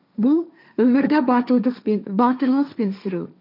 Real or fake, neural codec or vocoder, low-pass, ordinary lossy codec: fake; codec, 16 kHz, 1.1 kbps, Voila-Tokenizer; 5.4 kHz; none